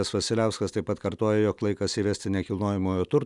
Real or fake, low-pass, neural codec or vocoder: real; 10.8 kHz; none